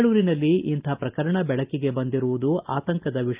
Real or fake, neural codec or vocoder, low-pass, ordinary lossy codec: real; none; 3.6 kHz; Opus, 32 kbps